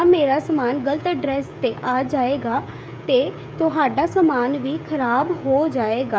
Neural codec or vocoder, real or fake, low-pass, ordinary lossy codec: codec, 16 kHz, 16 kbps, FreqCodec, smaller model; fake; none; none